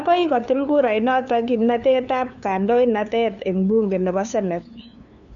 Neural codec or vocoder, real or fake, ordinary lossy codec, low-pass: codec, 16 kHz, 2 kbps, FunCodec, trained on LibriTTS, 25 frames a second; fake; none; 7.2 kHz